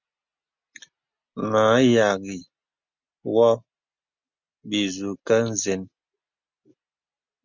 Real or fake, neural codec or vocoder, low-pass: real; none; 7.2 kHz